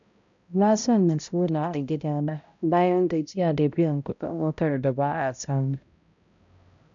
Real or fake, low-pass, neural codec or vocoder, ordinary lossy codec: fake; 7.2 kHz; codec, 16 kHz, 0.5 kbps, X-Codec, HuBERT features, trained on balanced general audio; none